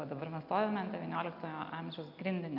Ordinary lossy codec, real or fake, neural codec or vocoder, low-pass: AAC, 48 kbps; real; none; 5.4 kHz